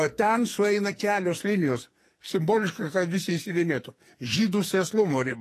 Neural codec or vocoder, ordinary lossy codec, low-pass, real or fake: codec, 44.1 kHz, 2.6 kbps, SNAC; AAC, 48 kbps; 14.4 kHz; fake